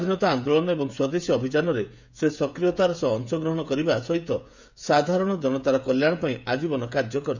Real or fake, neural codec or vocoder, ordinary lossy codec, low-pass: fake; codec, 16 kHz, 16 kbps, FreqCodec, smaller model; none; 7.2 kHz